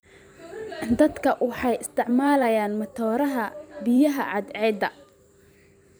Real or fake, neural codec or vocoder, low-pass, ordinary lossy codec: fake; vocoder, 44.1 kHz, 128 mel bands every 512 samples, BigVGAN v2; none; none